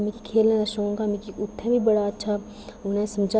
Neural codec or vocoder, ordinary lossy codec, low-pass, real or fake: none; none; none; real